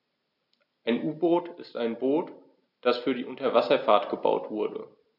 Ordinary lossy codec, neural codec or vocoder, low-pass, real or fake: none; none; 5.4 kHz; real